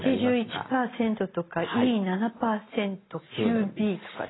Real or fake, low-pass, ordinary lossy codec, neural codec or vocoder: real; 7.2 kHz; AAC, 16 kbps; none